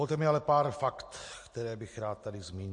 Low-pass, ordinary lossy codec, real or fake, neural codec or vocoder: 10.8 kHz; MP3, 48 kbps; real; none